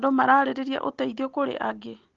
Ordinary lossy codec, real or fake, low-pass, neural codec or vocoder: Opus, 16 kbps; real; 7.2 kHz; none